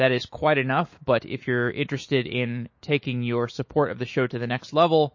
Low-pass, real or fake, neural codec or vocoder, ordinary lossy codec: 7.2 kHz; fake; autoencoder, 48 kHz, 128 numbers a frame, DAC-VAE, trained on Japanese speech; MP3, 32 kbps